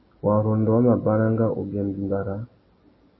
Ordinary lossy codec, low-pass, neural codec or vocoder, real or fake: MP3, 24 kbps; 7.2 kHz; none; real